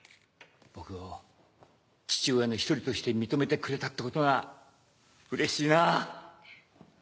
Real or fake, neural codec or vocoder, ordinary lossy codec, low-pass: real; none; none; none